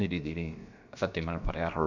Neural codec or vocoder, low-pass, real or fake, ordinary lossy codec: codec, 16 kHz, about 1 kbps, DyCAST, with the encoder's durations; 7.2 kHz; fake; MP3, 64 kbps